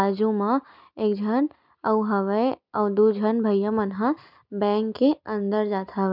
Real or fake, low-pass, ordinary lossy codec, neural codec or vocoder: real; 5.4 kHz; none; none